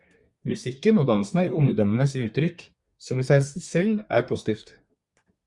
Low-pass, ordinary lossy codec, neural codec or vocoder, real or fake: 10.8 kHz; Opus, 64 kbps; codec, 24 kHz, 1 kbps, SNAC; fake